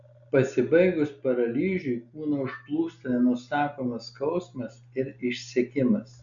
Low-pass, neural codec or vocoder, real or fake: 7.2 kHz; none; real